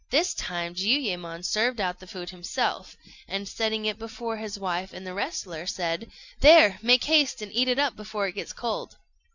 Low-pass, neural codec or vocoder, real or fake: 7.2 kHz; none; real